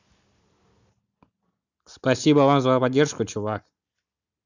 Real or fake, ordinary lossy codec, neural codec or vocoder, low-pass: real; none; none; 7.2 kHz